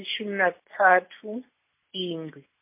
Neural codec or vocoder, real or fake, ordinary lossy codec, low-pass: none; real; MP3, 24 kbps; 3.6 kHz